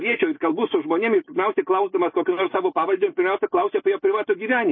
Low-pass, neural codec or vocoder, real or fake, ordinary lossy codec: 7.2 kHz; none; real; MP3, 24 kbps